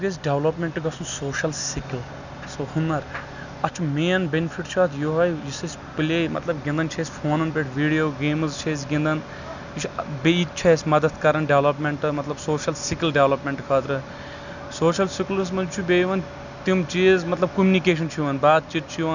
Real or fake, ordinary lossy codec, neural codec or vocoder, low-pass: real; none; none; 7.2 kHz